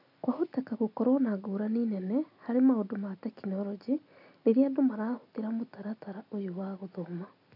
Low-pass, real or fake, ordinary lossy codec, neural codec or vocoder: 5.4 kHz; real; none; none